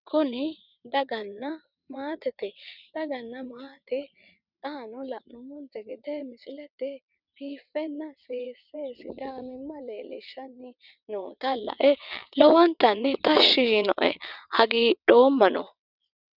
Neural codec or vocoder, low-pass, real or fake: vocoder, 22.05 kHz, 80 mel bands, WaveNeXt; 5.4 kHz; fake